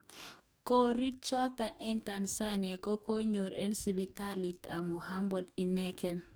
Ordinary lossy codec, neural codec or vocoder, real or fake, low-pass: none; codec, 44.1 kHz, 2.6 kbps, DAC; fake; none